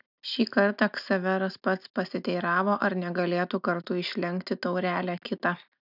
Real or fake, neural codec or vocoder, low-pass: real; none; 5.4 kHz